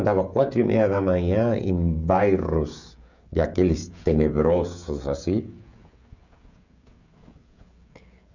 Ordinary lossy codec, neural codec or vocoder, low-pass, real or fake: none; codec, 16 kHz, 16 kbps, FreqCodec, smaller model; 7.2 kHz; fake